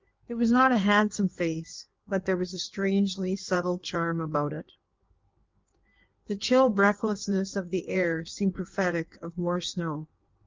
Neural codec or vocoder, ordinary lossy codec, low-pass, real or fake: codec, 16 kHz in and 24 kHz out, 1.1 kbps, FireRedTTS-2 codec; Opus, 32 kbps; 7.2 kHz; fake